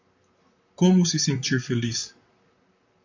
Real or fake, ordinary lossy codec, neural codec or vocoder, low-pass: fake; AAC, 48 kbps; vocoder, 44.1 kHz, 128 mel bands, Pupu-Vocoder; 7.2 kHz